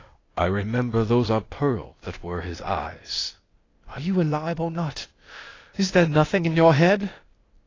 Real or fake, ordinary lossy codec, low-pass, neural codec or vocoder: fake; AAC, 32 kbps; 7.2 kHz; codec, 16 kHz, 0.8 kbps, ZipCodec